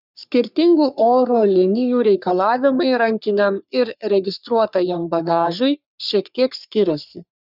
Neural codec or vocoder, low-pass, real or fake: codec, 44.1 kHz, 3.4 kbps, Pupu-Codec; 5.4 kHz; fake